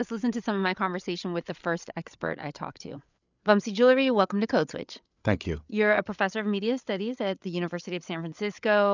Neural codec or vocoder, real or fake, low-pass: codec, 16 kHz, 8 kbps, FreqCodec, larger model; fake; 7.2 kHz